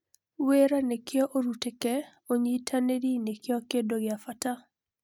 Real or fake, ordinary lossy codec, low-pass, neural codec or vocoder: real; none; 19.8 kHz; none